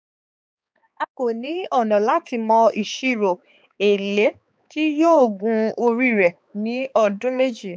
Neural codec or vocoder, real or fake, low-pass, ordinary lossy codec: codec, 16 kHz, 4 kbps, X-Codec, HuBERT features, trained on balanced general audio; fake; none; none